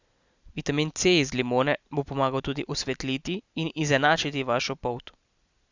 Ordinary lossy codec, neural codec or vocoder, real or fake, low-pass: Opus, 64 kbps; none; real; 7.2 kHz